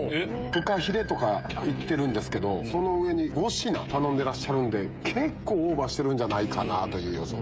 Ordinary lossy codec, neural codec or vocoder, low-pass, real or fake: none; codec, 16 kHz, 16 kbps, FreqCodec, smaller model; none; fake